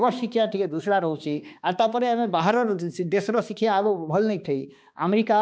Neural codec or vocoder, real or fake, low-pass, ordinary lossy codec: codec, 16 kHz, 2 kbps, X-Codec, HuBERT features, trained on balanced general audio; fake; none; none